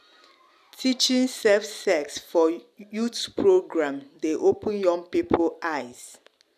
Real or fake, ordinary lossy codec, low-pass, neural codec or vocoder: real; none; 14.4 kHz; none